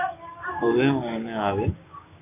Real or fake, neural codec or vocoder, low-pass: fake; codec, 16 kHz, 6 kbps, DAC; 3.6 kHz